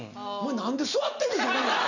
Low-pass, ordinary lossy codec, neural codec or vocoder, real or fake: 7.2 kHz; none; none; real